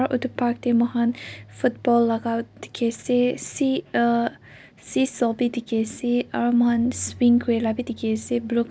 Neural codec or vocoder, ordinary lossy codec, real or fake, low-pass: none; none; real; none